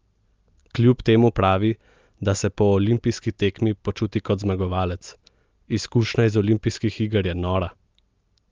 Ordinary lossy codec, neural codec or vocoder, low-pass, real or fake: Opus, 32 kbps; none; 7.2 kHz; real